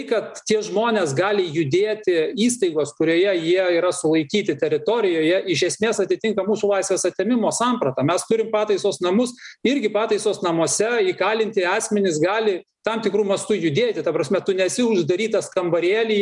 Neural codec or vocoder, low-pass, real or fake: none; 10.8 kHz; real